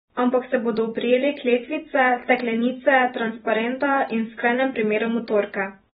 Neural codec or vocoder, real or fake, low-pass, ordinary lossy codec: vocoder, 44.1 kHz, 128 mel bands every 256 samples, BigVGAN v2; fake; 19.8 kHz; AAC, 16 kbps